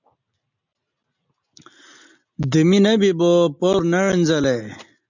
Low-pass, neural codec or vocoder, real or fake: 7.2 kHz; none; real